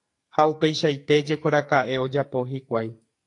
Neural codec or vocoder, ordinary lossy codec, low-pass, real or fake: codec, 44.1 kHz, 2.6 kbps, SNAC; AAC, 48 kbps; 10.8 kHz; fake